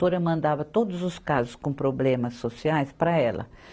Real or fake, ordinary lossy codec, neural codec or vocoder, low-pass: real; none; none; none